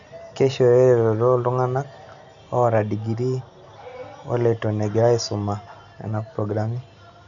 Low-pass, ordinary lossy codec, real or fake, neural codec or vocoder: 7.2 kHz; none; real; none